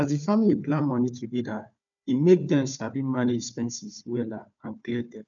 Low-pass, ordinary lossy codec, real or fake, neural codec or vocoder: 7.2 kHz; none; fake; codec, 16 kHz, 4 kbps, FunCodec, trained on Chinese and English, 50 frames a second